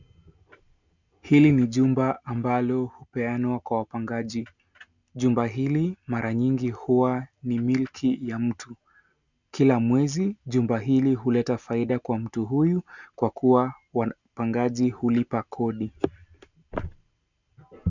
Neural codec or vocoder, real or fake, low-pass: none; real; 7.2 kHz